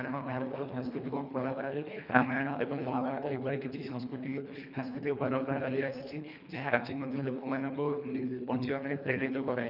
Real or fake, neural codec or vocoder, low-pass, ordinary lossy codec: fake; codec, 24 kHz, 1.5 kbps, HILCodec; 5.4 kHz; none